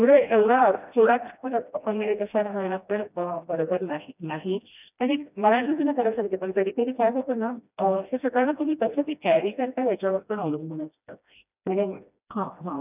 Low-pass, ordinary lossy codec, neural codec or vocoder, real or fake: 3.6 kHz; none; codec, 16 kHz, 1 kbps, FreqCodec, smaller model; fake